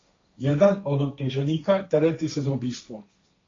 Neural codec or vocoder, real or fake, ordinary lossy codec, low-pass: codec, 16 kHz, 1.1 kbps, Voila-Tokenizer; fake; AAC, 32 kbps; 7.2 kHz